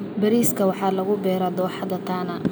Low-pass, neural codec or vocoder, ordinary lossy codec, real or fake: none; none; none; real